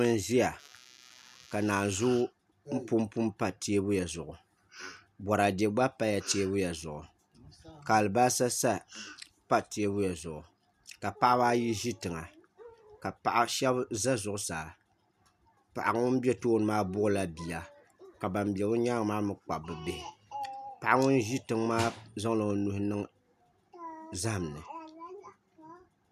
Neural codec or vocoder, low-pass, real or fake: none; 14.4 kHz; real